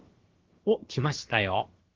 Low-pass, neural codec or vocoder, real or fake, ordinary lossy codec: 7.2 kHz; codec, 16 kHz, about 1 kbps, DyCAST, with the encoder's durations; fake; Opus, 16 kbps